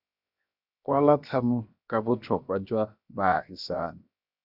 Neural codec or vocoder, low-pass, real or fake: codec, 16 kHz, 0.7 kbps, FocalCodec; 5.4 kHz; fake